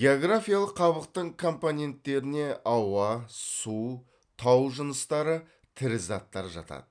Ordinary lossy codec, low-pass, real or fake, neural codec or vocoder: none; 9.9 kHz; real; none